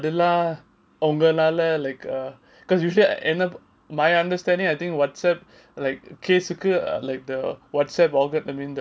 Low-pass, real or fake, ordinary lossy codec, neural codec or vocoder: none; real; none; none